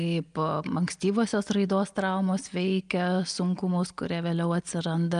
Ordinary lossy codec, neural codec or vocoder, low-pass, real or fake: Opus, 32 kbps; none; 9.9 kHz; real